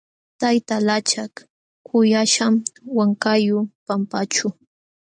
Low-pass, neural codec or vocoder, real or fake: 9.9 kHz; none; real